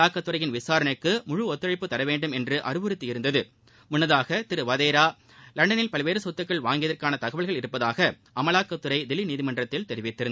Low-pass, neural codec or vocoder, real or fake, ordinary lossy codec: none; none; real; none